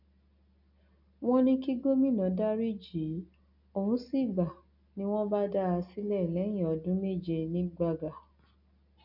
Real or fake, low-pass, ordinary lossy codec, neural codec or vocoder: real; 5.4 kHz; none; none